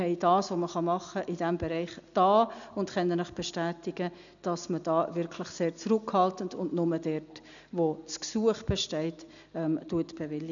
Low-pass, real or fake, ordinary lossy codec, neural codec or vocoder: 7.2 kHz; real; none; none